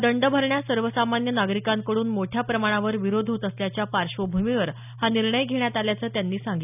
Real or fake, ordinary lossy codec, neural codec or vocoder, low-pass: real; none; none; 3.6 kHz